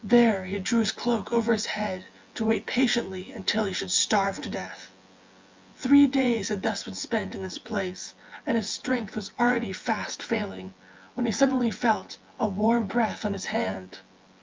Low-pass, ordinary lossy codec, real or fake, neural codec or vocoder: 7.2 kHz; Opus, 32 kbps; fake; vocoder, 24 kHz, 100 mel bands, Vocos